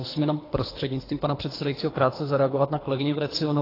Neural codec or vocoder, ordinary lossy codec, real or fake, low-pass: codec, 24 kHz, 3 kbps, HILCodec; AAC, 24 kbps; fake; 5.4 kHz